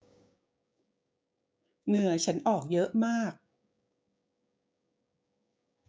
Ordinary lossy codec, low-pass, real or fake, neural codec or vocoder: none; none; fake; codec, 16 kHz, 6 kbps, DAC